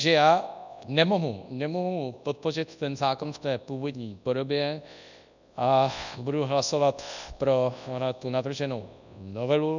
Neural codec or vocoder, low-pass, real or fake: codec, 24 kHz, 0.9 kbps, WavTokenizer, large speech release; 7.2 kHz; fake